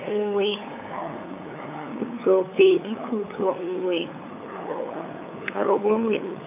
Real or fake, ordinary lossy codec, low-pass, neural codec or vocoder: fake; none; 3.6 kHz; codec, 16 kHz, 8 kbps, FunCodec, trained on LibriTTS, 25 frames a second